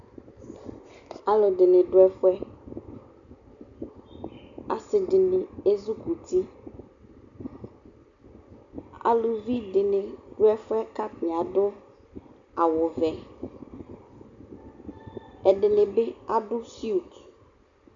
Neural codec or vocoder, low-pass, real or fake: none; 7.2 kHz; real